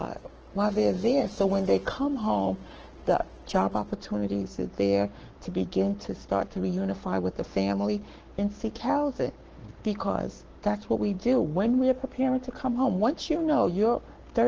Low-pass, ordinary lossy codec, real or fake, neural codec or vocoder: 7.2 kHz; Opus, 16 kbps; fake; codec, 44.1 kHz, 7.8 kbps, Pupu-Codec